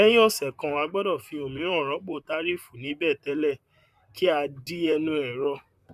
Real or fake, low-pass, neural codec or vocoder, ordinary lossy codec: fake; 14.4 kHz; vocoder, 44.1 kHz, 128 mel bands, Pupu-Vocoder; none